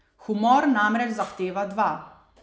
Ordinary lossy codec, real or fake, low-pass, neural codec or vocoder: none; real; none; none